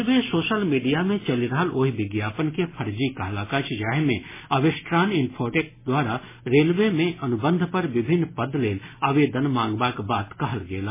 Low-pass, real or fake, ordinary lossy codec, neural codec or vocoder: 3.6 kHz; real; MP3, 16 kbps; none